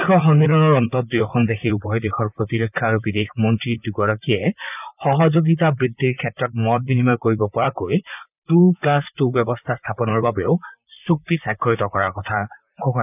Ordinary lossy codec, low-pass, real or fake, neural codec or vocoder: none; 3.6 kHz; fake; vocoder, 44.1 kHz, 128 mel bands, Pupu-Vocoder